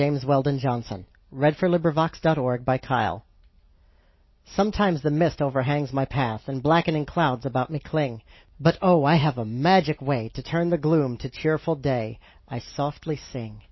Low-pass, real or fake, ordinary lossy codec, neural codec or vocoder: 7.2 kHz; real; MP3, 24 kbps; none